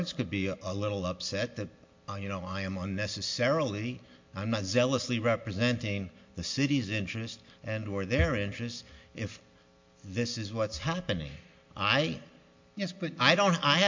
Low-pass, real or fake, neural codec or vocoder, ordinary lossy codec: 7.2 kHz; real; none; MP3, 48 kbps